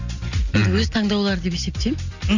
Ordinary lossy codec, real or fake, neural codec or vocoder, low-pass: none; real; none; 7.2 kHz